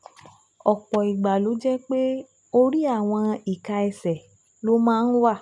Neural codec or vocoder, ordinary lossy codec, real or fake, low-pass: none; none; real; 10.8 kHz